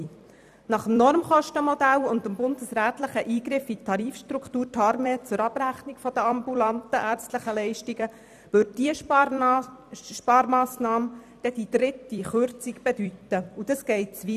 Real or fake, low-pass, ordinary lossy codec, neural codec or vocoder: fake; 14.4 kHz; none; vocoder, 44.1 kHz, 128 mel bands every 256 samples, BigVGAN v2